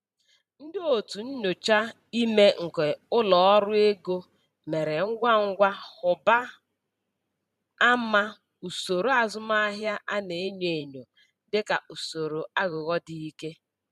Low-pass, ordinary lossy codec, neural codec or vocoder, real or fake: 14.4 kHz; MP3, 96 kbps; vocoder, 44.1 kHz, 128 mel bands every 512 samples, BigVGAN v2; fake